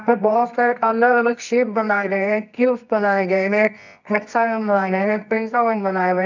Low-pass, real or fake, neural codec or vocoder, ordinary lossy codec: 7.2 kHz; fake; codec, 24 kHz, 0.9 kbps, WavTokenizer, medium music audio release; none